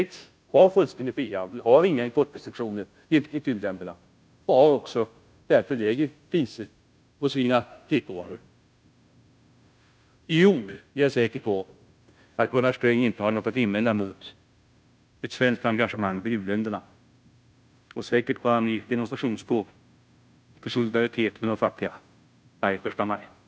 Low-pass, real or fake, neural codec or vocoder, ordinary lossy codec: none; fake; codec, 16 kHz, 0.5 kbps, FunCodec, trained on Chinese and English, 25 frames a second; none